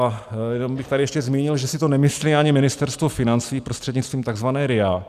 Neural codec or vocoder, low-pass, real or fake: none; 14.4 kHz; real